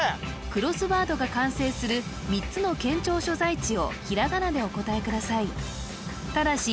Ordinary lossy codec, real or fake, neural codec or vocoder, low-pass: none; real; none; none